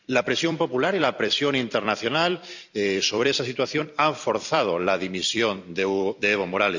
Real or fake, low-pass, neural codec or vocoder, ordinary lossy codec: fake; 7.2 kHz; vocoder, 44.1 kHz, 128 mel bands every 256 samples, BigVGAN v2; none